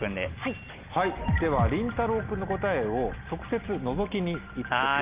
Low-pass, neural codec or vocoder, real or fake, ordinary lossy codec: 3.6 kHz; none; real; Opus, 32 kbps